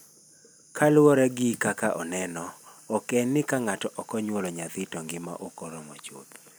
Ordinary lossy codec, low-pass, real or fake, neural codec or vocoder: none; none; real; none